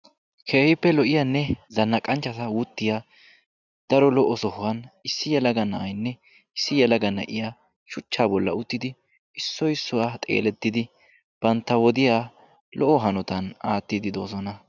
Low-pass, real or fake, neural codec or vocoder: 7.2 kHz; real; none